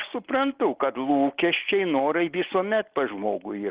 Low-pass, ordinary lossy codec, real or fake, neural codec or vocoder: 3.6 kHz; Opus, 16 kbps; real; none